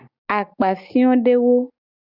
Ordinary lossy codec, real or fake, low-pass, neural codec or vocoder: Opus, 64 kbps; real; 5.4 kHz; none